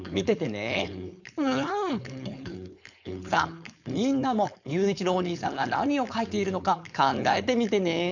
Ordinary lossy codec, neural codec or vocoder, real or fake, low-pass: none; codec, 16 kHz, 4.8 kbps, FACodec; fake; 7.2 kHz